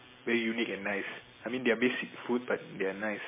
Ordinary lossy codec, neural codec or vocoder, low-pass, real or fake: MP3, 16 kbps; none; 3.6 kHz; real